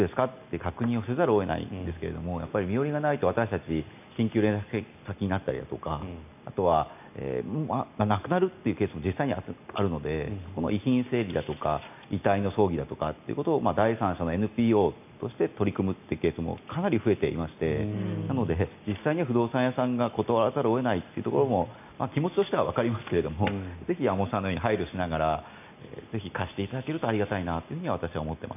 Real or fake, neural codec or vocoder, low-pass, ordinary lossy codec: real; none; 3.6 kHz; none